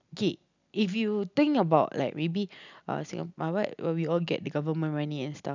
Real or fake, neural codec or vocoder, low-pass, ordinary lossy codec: real; none; 7.2 kHz; none